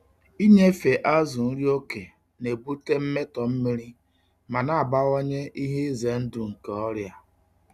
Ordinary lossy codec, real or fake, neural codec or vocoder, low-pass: none; real; none; 14.4 kHz